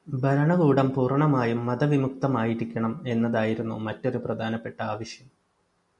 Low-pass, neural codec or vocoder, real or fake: 10.8 kHz; none; real